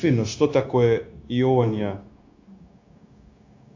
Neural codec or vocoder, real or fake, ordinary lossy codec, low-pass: codec, 16 kHz, 0.9 kbps, LongCat-Audio-Codec; fake; AAC, 48 kbps; 7.2 kHz